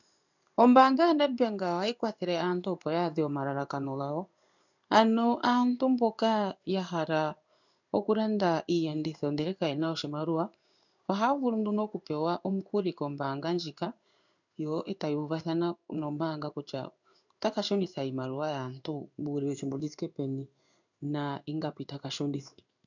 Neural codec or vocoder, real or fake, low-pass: codec, 16 kHz in and 24 kHz out, 1 kbps, XY-Tokenizer; fake; 7.2 kHz